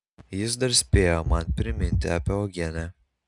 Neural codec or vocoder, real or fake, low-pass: none; real; 10.8 kHz